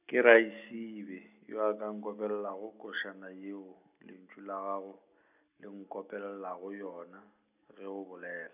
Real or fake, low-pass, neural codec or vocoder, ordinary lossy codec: real; 3.6 kHz; none; none